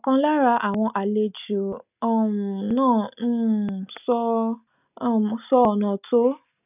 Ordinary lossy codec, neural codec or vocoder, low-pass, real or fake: none; none; 3.6 kHz; real